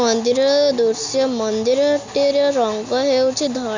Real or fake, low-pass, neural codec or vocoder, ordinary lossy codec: real; 7.2 kHz; none; Opus, 64 kbps